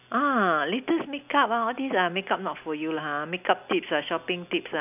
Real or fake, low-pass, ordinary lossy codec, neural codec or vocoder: real; 3.6 kHz; none; none